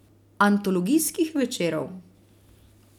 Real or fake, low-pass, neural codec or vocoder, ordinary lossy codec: real; 19.8 kHz; none; none